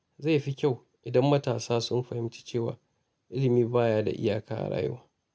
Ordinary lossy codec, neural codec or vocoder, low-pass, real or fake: none; none; none; real